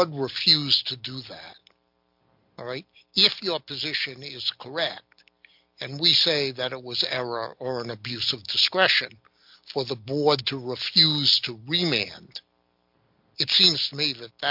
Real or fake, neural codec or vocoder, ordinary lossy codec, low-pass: real; none; MP3, 48 kbps; 5.4 kHz